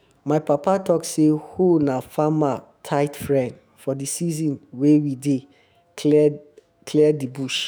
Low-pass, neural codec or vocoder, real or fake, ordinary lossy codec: none; autoencoder, 48 kHz, 128 numbers a frame, DAC-VAE, trained on Japanese speech; fake; none